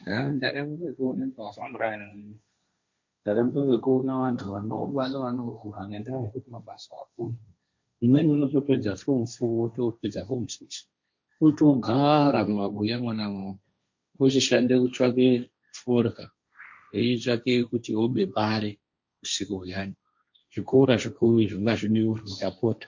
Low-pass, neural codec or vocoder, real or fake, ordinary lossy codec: 7.2 kHz; codec, 16 kHz, 1.1 kbps, Voila-Tokenizer; fake; MP3, 48 kbps